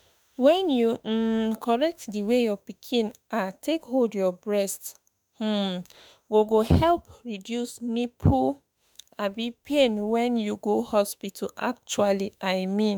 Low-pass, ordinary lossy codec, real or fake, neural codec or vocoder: none; none; fake; autoencoder, 48 kHz, 32 numbers a frame, DAC-VAE, trained on Japanese speech